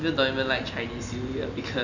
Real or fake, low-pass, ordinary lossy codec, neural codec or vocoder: real; 7.2 kHz; none; none